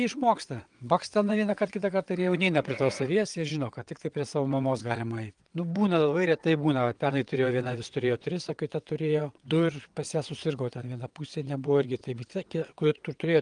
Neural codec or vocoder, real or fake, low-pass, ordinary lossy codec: vocoder, 22.05 kHz, 80 mel bands, WaveNeXt; fake; 9.9 kHz; Opus, 32 kbps